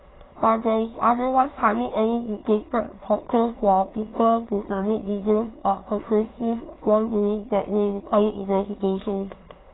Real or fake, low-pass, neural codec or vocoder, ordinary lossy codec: fake; 7.2 kHz; autoencoder, 22.05 kHz, a latent of 192 numbers a frame, VITS, trained on many speakers; AAC, 16 kbps